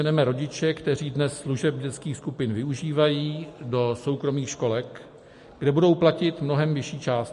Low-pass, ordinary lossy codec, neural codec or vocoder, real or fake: 14.4 kHz; MP3, 48 kbps; none; real